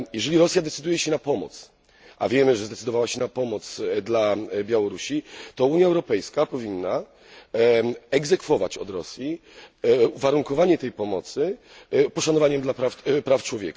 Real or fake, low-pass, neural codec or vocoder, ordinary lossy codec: real; none; none; none